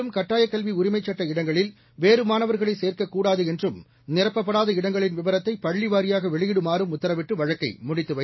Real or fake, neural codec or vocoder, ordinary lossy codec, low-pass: real; none; MP3, 24 kbps; 7.2 kHz